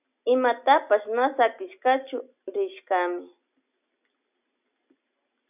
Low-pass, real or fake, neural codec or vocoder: 3.6 kHz; real; none